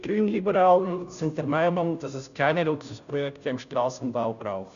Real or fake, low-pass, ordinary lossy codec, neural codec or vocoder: fake; 7.2 kHz; none; codec, 16 kHz, 0.5 kbps, FunCodec, trained on Chinese and English, 25 frames a second